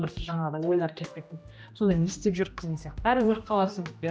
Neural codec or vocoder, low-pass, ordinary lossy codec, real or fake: codec, 16 kHz, 1 kbps, X-Codec, HuBERT features, trained on general audio; none; none; fake